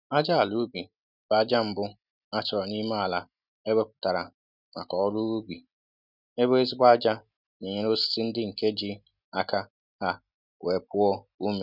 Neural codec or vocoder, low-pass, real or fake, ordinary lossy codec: none; 5.4 kHz; real; none